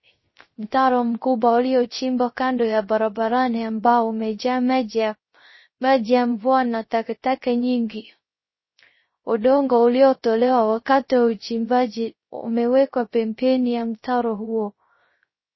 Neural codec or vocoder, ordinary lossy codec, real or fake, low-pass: codec, 16 kHz, 0.3 kbps, FocalCodec; MP3, 24 kbps; fake; 7.2 kHz